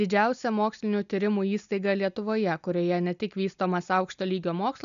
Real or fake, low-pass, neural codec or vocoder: real; 7.2 kHz; none